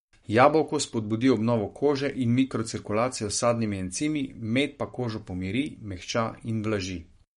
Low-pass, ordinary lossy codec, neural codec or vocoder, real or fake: 19.8 kHz; MP3, 48 kbps; codec, 44.1 kHz, 7.8 kbps, Pupu-Codec; fake